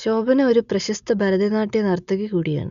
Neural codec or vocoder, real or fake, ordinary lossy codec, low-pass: none; real; none; 7.2 kHz